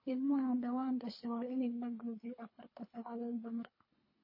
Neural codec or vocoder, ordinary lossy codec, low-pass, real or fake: codec, 24 kHz, 3 kbps, HILCodec; MP3, 24 kbps; 5.4 kHz; fake